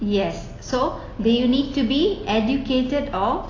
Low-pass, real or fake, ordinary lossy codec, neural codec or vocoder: 7.2 kHz; real; AAC, 32 kbps; none